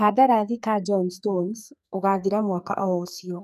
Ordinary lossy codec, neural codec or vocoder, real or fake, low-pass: none; codec, 44.1 kHz, 2.6 kbps, SNAC; fake; 14.4 kHz